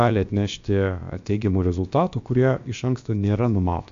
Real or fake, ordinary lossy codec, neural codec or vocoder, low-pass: fake; AAC, 64 kbps; codec, 16 kHz, about 1 kbps, DyCAST, with the encoder's durations; 7.2 kHz